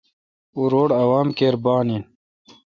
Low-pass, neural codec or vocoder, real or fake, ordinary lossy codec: 7.2 kHz; none; real; Opus, 64 kbps